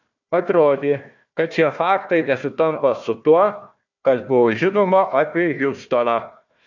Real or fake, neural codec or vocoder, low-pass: fake; codec, 16 kHz, 1 kbps, FunCodec, trained on Chinese and English, 50 frames a second; 7.2 kHz